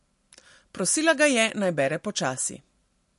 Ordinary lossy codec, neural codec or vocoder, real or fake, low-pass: MP3, 48 kbps; none; real; 14.4 kHz